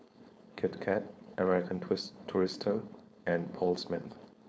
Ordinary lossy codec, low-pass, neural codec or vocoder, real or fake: none; none; codec, 16 kHz, 4.8 kbps, FACodec; fake